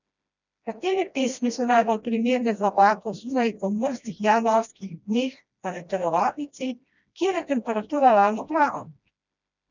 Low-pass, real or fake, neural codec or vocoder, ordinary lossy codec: 7.2 kHz; fake; codec, 16 kHz, 1 kbps, FreqCodec, smaller model; none